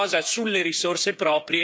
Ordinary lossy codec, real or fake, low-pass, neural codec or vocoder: none; fake; none; codec, 16 kHz, 8 kbps, FreqCodec, smaller model